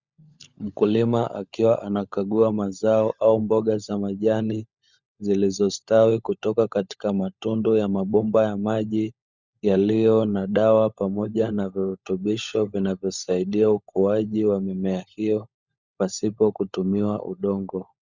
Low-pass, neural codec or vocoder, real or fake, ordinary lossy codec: 7.2 kHz; codec, 16 kHz, 16 kbps, FunCodec, trained on LibriTTS, 50 frames a second; fake; Opus, 64 kbps